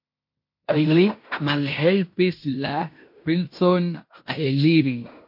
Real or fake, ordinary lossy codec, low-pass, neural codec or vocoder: fake; MP3, 32 kbps; 5.4 kHz; codec, 16 kHz in and 24 kHz out, 0.9 kbps, LongCat-Audio-Codec, fine tuned four codebook decoder